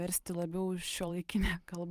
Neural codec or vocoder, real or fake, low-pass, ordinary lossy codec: vocoder, 44.1 kHz, 128 mel bands every 512 samples, BigVGAN v2; fake; 14.4 kHz; Opus, 32 kbps